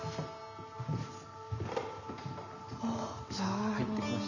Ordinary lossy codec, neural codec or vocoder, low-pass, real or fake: none; none; 7.2 kHz; real